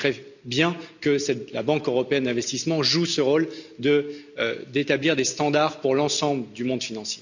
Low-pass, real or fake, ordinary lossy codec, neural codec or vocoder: 7.2 kHz; real; none; none